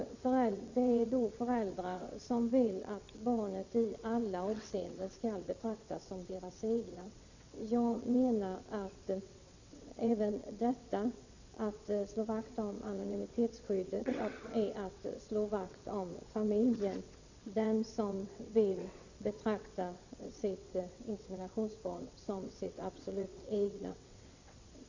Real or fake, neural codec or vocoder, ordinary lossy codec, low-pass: fake; vocoder, 22.05 kHz, 80 mel bands, WaveNeXt; Opus, 64 kbps; 7.2 kHz